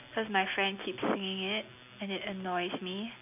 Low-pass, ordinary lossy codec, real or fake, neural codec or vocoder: 3.6 kHz; none; real; none